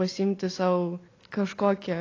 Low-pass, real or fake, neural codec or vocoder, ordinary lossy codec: 7.2 kHz; real; none; AAC, 32 kbps